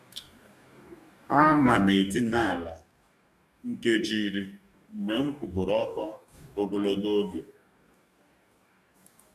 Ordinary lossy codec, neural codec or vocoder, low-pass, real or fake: none; codec, 44.1 kHz, 2.6 kbps, DAC; 14.4 kHz; fake